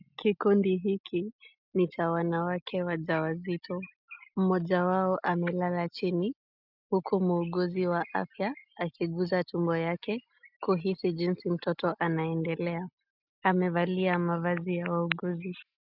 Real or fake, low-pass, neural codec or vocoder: real; 5.4 kHz; none